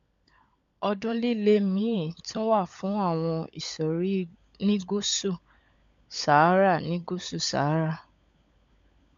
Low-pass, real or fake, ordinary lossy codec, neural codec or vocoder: 7.2 kHz; fake; MP3, 64 kbps; codec, 16 kHz, 16 kbps, FunCodec, trained on LibriTTS, 50 frames a second